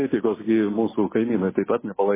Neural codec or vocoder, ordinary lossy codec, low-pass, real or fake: none; MP3, 16 kbps; 3.6 kHz; real